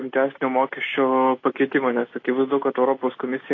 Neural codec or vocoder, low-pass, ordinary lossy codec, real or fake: none; 7.2 kHz; AAC, 32 kbps; real